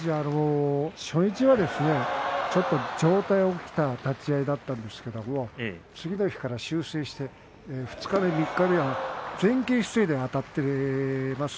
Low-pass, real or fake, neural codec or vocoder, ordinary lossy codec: none; real; none; none